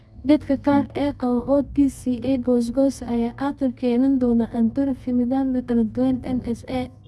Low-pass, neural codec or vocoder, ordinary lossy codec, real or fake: none; codec, 24 kHz, 0.9 kbps, WavTokenizer, medium music audio release; none; fake